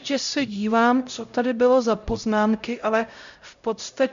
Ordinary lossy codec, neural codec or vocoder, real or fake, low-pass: MP3, 64 kbps; codec, 16 kHz, 0.5 kbps, X-Codec, HuBERT features, trained on LibriSpeech; fake; 7.2 kHz